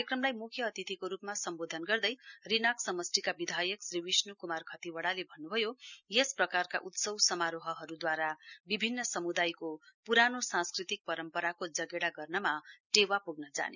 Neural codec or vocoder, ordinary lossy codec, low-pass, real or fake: none; none; 7.2 kHz; real